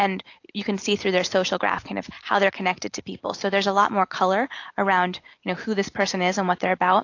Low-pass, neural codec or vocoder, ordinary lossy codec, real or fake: 7.2 kHz; none; AAC, 48 kbps; real